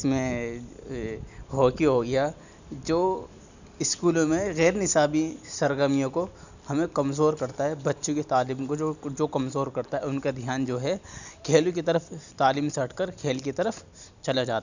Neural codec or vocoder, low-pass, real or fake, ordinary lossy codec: none; 7.2 kHz; real; none